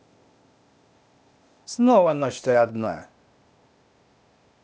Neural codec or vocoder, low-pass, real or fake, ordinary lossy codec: codec, 16 kHz, 0.8 kbps, ZipCodec; none; fake; none